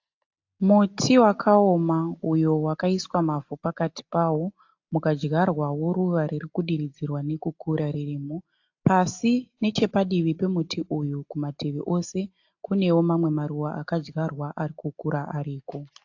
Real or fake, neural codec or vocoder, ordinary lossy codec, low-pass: real; none; AAC, 48 kbps; 7.2 kHz